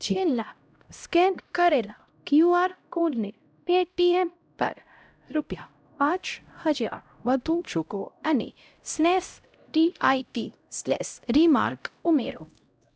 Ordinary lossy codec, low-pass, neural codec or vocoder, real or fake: none; none; codec, 16 kHz, 0.5 kbps, X-Codec, HuBERT features, trained on LibriSpeech; fake